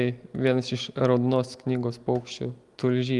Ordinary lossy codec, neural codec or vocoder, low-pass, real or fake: Opus, 24 kbps; none; 10.8 kHz; real